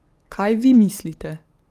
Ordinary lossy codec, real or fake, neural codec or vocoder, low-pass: Opus, 32 kbps; fake; codec, 44.1 kHz, 7.8 kbps, DAC; 14.4 kHz